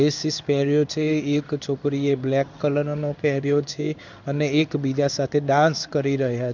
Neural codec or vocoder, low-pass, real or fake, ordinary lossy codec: codec, 16 kHz in and 24 kHz out, 1 kbps, XY-Tokenizer; 7.2 kHz; fake; none